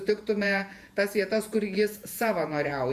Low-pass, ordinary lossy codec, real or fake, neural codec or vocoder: 14.4 kHz; Opus, 64 kbps; fake; vocoder, 48 kHz, 128 mel bands, Vocos